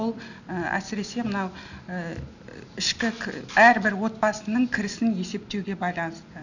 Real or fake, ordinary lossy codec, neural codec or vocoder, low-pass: real; none; none; 7.2 kHz